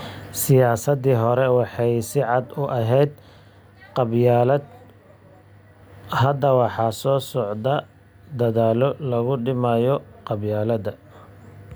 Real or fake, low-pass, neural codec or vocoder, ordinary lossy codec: real; none; none; none